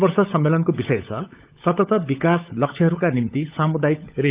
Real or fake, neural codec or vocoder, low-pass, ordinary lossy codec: fake; codec, 16 kHz, 16 kbps, FunCodec, trained on LibriTTS, 50 frames a second; 3.6 kHz; Opus, 24 kbps